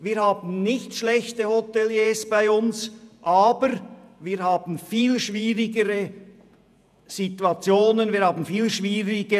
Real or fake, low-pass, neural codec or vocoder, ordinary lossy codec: fake; 14.4 kHz; vocoder, 48 kHz, 128 mel bands, Vocos; none